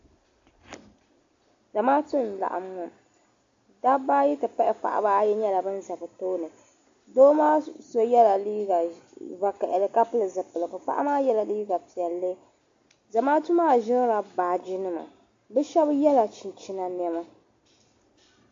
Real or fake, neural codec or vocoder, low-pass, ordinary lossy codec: real; none; 7.2 kHz; AAC, 48 kbps